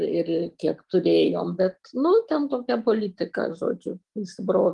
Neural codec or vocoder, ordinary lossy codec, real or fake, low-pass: none; Opus, 24 kbps; real; 10.8 kHz